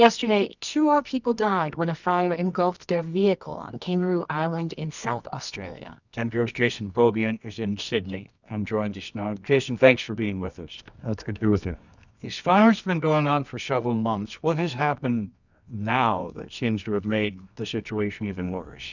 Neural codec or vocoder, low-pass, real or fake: codec, 24 kHz, 0.9 kbps, WavTokenizer, medium music audio release; 7.2 kHz; fake